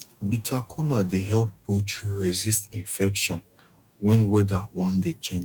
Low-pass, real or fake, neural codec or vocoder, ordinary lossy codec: 19.8 kHz; fake; codec, 44.1 kHz, 2.6 kbps, DAC; none